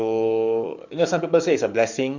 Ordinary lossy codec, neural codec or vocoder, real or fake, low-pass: none; codec, 44.1 kHz, 7.8 kbps, DAC; fake; 7.2 kHz